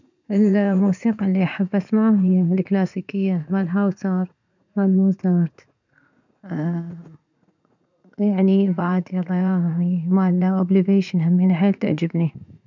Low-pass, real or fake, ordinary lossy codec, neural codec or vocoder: 7.2 kHz; fake; none; vocoder, 44.1 kHz, 80 mel bands, Vocos